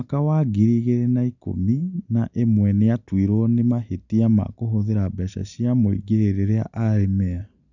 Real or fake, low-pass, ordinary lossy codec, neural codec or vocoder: real; 7.2 kHz; none; none